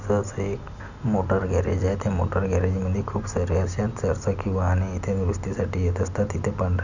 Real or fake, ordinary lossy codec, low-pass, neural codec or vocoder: real; none; 7.2 kHz; none